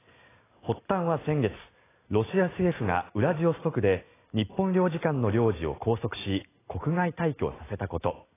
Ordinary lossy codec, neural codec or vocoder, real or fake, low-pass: AAC, 16 kbps; none; real; 3.6 kHz